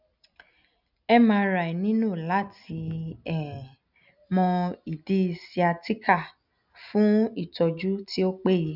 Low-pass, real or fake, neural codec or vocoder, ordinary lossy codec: 5.4 kHz; real; none; none